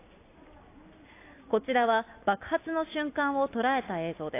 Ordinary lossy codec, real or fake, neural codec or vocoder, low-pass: AAC, 24 kbps; real; none; 3.6 kHz